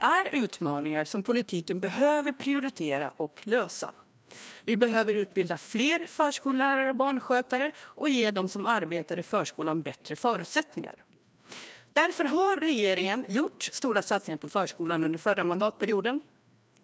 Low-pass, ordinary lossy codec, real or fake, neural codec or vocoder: none; none; fake; codec, 16 kHz, 1 kbps, FreqCodec, larger model